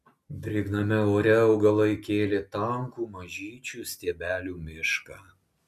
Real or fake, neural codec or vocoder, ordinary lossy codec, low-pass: real; none; MP3, 96 kbps; 14.4 kHz